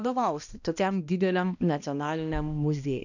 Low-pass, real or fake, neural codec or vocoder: 7.2 kHz; fake; codec, 16 kHz, 1 kbps, X-Codec, HuBERT features, trained on balanced general audio